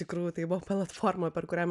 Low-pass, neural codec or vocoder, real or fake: 10.8 kHz; none; real